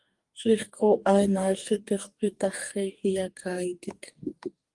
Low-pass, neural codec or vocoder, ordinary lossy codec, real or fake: 10.8 kHz; codec, 44.1 kHz, 2.6 kbps, DAC; Opus, 24 kbps; fake